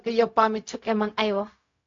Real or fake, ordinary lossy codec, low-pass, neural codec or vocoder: fake; AAC, 48 kbps; 7.2 kHz; codec, 16 kHz, 0.4 kbps, LongCat-Audio-Codec